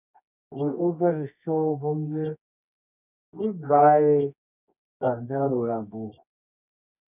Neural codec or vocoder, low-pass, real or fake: codec, 24 kHz, 0.9 kbps, WavTokenizer, medium music audio release; 3.6 kHz; fake